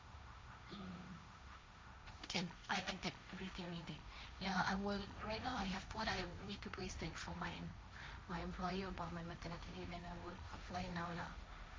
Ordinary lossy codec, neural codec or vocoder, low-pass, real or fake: none; codec, 16 kHz, 1.1 kbps, Voila-Tokenizer; none; fake